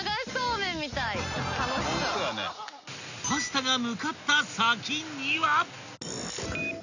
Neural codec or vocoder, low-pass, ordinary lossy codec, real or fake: none; 7.2 kHz; none; real